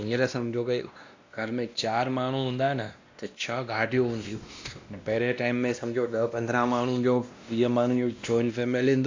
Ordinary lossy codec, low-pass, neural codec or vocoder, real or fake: AAC, 48 kbps; 7.2 kHz; codec, 16 kHz, 1 kbps, X-Codec, WavLM features, trained on Multilingual LibriSpeech; fake